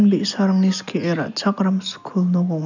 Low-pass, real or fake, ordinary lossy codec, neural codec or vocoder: 7.2 kHz; real; none; none